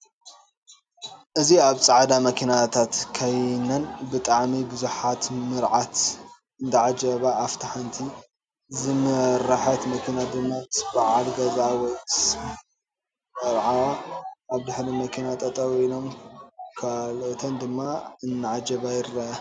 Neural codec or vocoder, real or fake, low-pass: none; real; 9.9 kHz